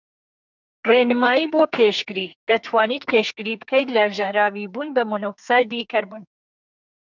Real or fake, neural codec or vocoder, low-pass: fake; codec, 44.1 kHz, 2.6 kbps, SNAC; 7.2 kHz